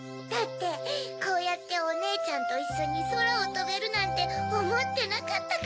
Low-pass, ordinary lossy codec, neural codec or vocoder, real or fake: none; none; none; real